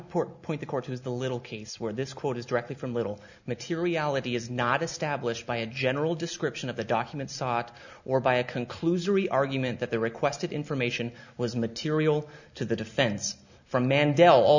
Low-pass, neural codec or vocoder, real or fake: 7.2 kHz; none; real